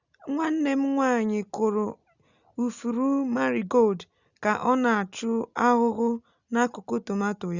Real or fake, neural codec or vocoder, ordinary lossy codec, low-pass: real; none; Opus, 64 kbps; 7.2 kHz